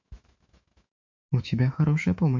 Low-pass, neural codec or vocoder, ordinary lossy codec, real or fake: 7.2 kHz; autoencoder, 48 kHz, 128 numbers a frame, DAC-VAE, trained on Japanese speech; MP3, 48 kbps; fake